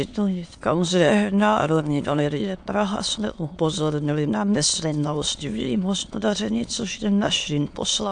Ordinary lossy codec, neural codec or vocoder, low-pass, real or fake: AAC, 64 kbps; autoencoder, 22.05 kHz, a latent of 192 numbers a frame, VITS, trained on many speakers; 9.9 kHz; fake